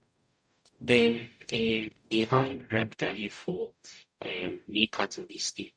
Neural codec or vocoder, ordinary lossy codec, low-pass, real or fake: codec, 44.1 kHz, 0.9 kbps, DAC; none; 9.9 kHz; fake